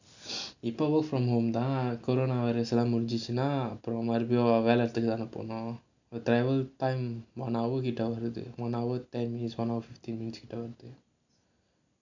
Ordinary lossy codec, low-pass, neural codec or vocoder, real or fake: none; 7.2 kHz; none; real